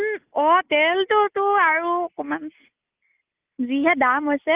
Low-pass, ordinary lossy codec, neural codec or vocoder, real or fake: 3.6 kHz; Opus, 32 kbps; none; real